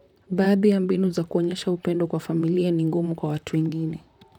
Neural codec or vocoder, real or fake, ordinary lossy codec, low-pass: vocoder, 44.1 kHz, 128 mel bands, Pupu-Vocoder; fake; none; 19.8 kHz